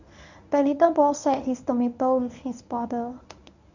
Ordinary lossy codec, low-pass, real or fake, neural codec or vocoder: none; 7.2 kHz; fake; codec, 24 kHz, 0.9 kbps, WavTokenizer, medium speech release version 1